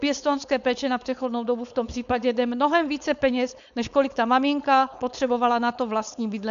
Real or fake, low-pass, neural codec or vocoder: fake; 7.2 kHz; codec, 16 kHz, 4.8 kbps, FACodec